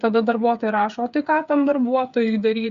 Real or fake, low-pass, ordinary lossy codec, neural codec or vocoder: fake; 7.2 kHz; Opus, 64 kbps; codec, 16 kHz, 8 kbps, FreqCodec, smaller model